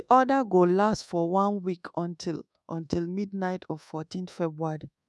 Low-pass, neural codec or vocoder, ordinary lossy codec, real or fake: none; codec, 24 kHz, 1.2 kbps, DualCodec; none; fake